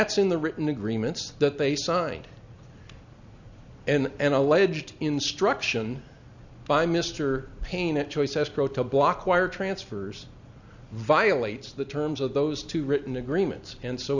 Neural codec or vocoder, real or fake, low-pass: none; real; 7.2 kHz